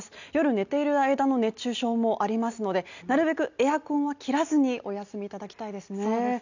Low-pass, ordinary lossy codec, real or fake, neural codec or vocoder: 7.2 kHz; none; real; none